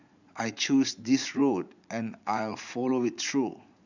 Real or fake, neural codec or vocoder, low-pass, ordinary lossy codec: fake; vocoder, 44.1 kHz, 80 mel bands, Vocos; 7.2 kHz; none